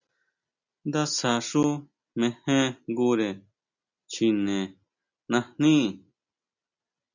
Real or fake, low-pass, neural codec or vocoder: real; 7.2 kHz; none